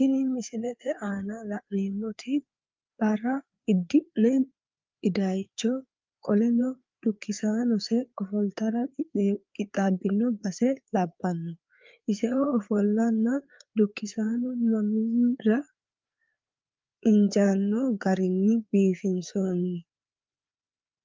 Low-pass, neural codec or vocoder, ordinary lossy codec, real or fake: 7.2 kHz; codec, 16 kHz, 4 kbps, FreqCodec, larger model; Opus, 24 kbps; fake